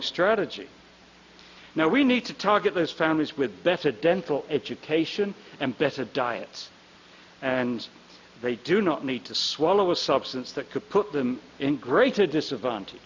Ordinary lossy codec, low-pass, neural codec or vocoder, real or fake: MP3, 64 kbps; 7.2 kHz; none; real